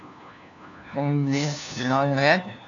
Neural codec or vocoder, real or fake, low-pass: codec, 16 kHz, 1 kbps, FunCodec, trained on LibriTTS, 50 frames a second; fake; 7.2 kHz